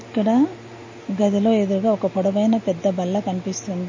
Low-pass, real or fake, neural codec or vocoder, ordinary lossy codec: 7.2 kHz; real; none; MP3, 32 kbps